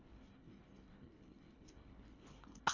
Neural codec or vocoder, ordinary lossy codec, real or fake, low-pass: codec, 24 kHz, 1.5 kbps, HILCodec; AAC, 48 kbps; fake; 7.2 kHz